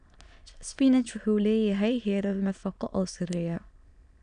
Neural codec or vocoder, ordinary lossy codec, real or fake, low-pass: autoencoder, 22.05 kHz, a latent of 192 numbers a frame, VITS, trained on many speakers; none; fake; 9.9 kHz